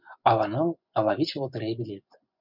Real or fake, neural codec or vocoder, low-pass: fake; vocoder, 24 kHz, 100 mel bands, Vocos; 5.4 kHz